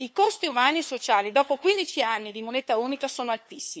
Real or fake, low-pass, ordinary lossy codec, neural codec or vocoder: fake; none; none; codec, 16 kHz, 2 kbps, FunCodec, trained on LibriTTS, 25 frames a second